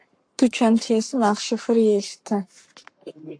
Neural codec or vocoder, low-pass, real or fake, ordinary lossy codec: codec, 44.1 kHz, 7.8 kbps, Pupu-Codec; 9.9 kHz; fake; AAC, 64 kbps